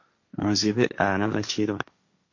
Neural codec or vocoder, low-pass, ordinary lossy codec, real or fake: codec, 16 kHz, 1.1 kbps, Voila-Tokenizer; 7.2 kHz; MP3, 48 kbps; fake